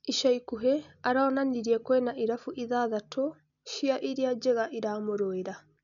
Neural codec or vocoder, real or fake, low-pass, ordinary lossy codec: none; real; 7.2 kHz; MP3, 96 kbps